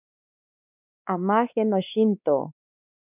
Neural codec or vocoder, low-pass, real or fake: codec, 16 kHz, 2 kbps, X-Codec, WavLM features, trained on Multilingual LibriSpeech; 3.6 kHz; fake